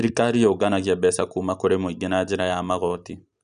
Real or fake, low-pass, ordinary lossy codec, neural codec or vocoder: real; 9.9 kHz; none; none